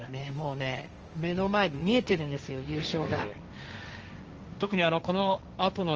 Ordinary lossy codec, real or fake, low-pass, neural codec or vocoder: Opus, 24 kbps; fake; 7.2 kHz; codec, 16 kHz, 1.1 kbps, Voila-Tokenizer